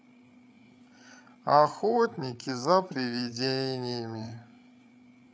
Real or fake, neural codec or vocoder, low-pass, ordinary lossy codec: fake; codec, 16 kHz, 8 kbps, FreqCodec, larger model; none; none